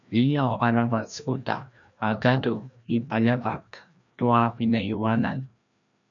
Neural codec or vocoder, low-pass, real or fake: codec, 16 kHz, 1 kbps, FreqCodec, larger model; 7.2 kHz; fake